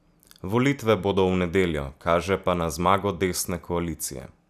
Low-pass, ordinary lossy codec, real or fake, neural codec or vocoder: 14.4 kHz; none; real; none